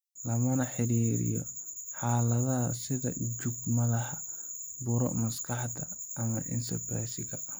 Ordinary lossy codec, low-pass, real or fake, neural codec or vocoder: none; none; real; none